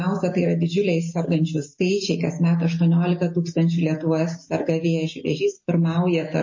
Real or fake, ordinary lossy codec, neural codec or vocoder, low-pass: real; MP3, 32 kbps; none; 7.2 kHz